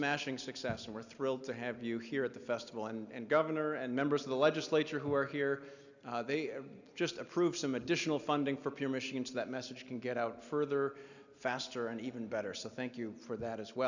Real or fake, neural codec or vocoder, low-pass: real; none; 7.2 kHz